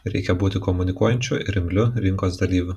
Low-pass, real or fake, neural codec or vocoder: 14.4 kHz; fake; vocoder, 44.1 kHz, 128 mel bands every 256 samples, BigVGAN v2